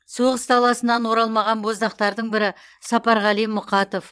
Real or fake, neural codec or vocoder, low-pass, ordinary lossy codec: fake; vocoder, 22.05 kHz, 80 mel bands, WaveNeXt; none; none